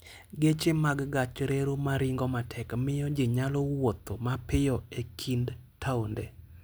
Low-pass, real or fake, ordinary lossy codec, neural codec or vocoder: none; real; none; none